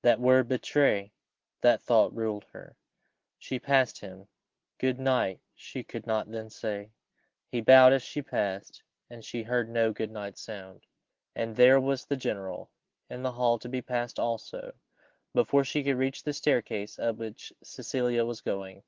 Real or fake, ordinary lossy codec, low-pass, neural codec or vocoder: real; Opus, 16 kbps; 7.2 kHz; none